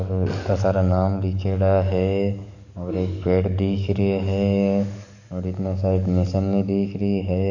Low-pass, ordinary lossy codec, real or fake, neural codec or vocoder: 7.2 kHz; none; fake; codec, 44.1 kHz, 7.8 kbps, DAC